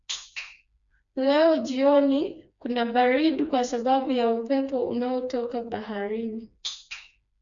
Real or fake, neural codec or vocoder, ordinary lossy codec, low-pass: fake; codec, 16 kHz, 2 kbps, FreqCodec, smaller model; MP3, 64 kbps; 7.2 kHz